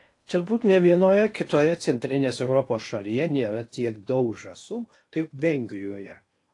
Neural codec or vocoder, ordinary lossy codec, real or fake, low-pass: codec, 16 kHz in and 24 kHz out, 0.8 kbps, FocalCodec, streaming, 65536 codes; AAC, 48 kbps; fake; 10.8 kHz